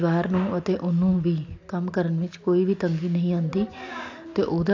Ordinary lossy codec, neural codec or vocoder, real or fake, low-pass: AAC, 48 kbps; none; real; 7.2 kHz